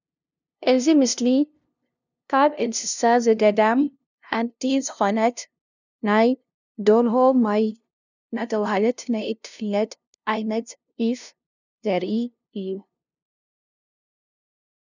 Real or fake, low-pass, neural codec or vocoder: fake; 7.2 kHz; codec, 16 kHz, 0.5 kbps, FunCodec, trained on LibriTTS, 25 frames a second